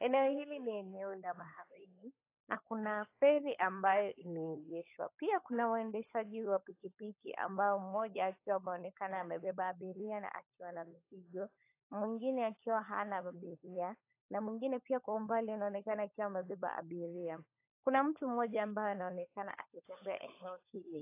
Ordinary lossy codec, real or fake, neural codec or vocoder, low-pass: AAC, 24 kbps; fake; codec, 16 kHz, 16 kbps, FunCodec, trained on LibriTTS, 50 frames a second; 3.6 kHz